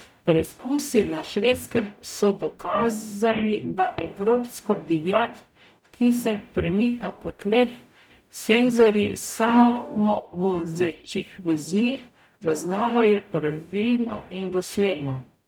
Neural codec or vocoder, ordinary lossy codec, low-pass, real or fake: codec, 44.1 kHz, 0.9 kbps, DAC; none; none; fake